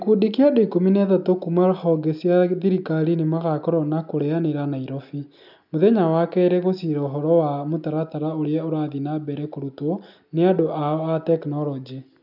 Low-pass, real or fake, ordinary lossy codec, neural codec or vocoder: 5.4 kHz; real; none; none